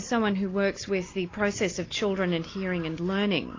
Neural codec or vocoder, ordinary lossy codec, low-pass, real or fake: none; AAC, 32 kbps; 7.2 kHz; real